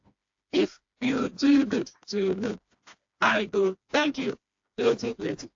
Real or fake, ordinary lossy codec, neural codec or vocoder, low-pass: fake; AAC, 48 kbps; codec, 16 kHz, 2 kbps, FreqCodec, smaller model; 7.2 kHz